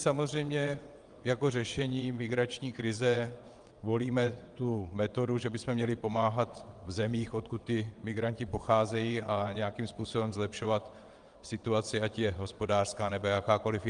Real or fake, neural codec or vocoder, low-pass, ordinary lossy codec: fake; vocoder, 22.05 kHz, 80 mel bands, WaveNeXt; 9.9 kHz; Opus, 32 kbps